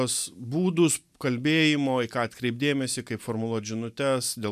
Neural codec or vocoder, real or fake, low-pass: none; real; 14.4 kHz